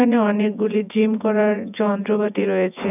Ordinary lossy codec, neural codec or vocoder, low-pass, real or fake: none; vocoder, 24 kHz, 100 mel bands, Vocos; 3.6 kHz; fake